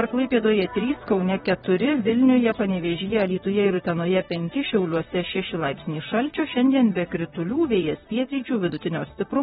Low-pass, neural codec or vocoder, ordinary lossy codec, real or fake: 19.8 kHz; vocoder, 44.1 kHz, 128 mel bands, Pupu-Vocoder; AAC, 16 kbps; fake